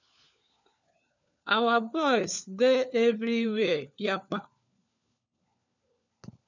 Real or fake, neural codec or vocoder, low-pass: fake; codec, 16 kHz, 4 kbps, FunCodec, trained on LibriTTS, 50 frames a second; 7.2 kHz